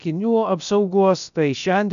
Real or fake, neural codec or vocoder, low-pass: fake; codec, 16 kHz, about 1 kbps, DyCAST, with the encoder's durations; 7.2 kHz